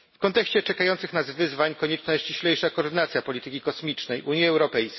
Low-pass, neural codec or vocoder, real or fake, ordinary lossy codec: 7.2 kHz; none; real; MP3, 24 kbps